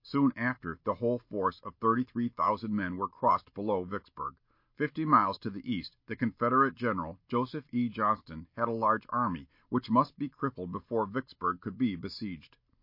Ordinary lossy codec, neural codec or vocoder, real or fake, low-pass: MP3, 32 kbps; none; real; 5.4 kHz